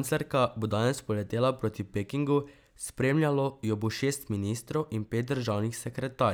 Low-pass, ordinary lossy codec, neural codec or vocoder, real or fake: none; none; none; real